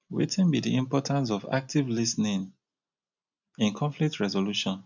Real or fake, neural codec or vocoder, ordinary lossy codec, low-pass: real; none; none; 7.2 kHz